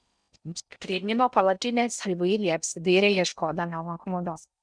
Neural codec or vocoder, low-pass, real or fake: codec, 16 kHz in and 24 kHz out, 0.6 kbps, FocalCodec, streaming, 4096 codes; 9.9 kHz; fake